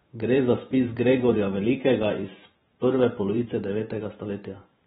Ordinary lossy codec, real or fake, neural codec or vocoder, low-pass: AAC, 16 kbps; fake; vocoder, 44.1 kHz, 128 mel bands every 512 samples, BigVGAN v2; 19.8 kHz